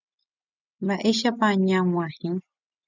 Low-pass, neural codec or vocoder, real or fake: 7.2 kHz; none; real